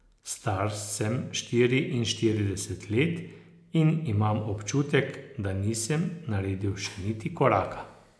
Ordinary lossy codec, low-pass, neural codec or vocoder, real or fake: none; none; none; real